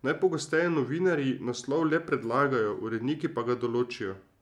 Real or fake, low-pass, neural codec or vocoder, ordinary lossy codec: real; 19.8 kHz; none; MP3, 96 kbps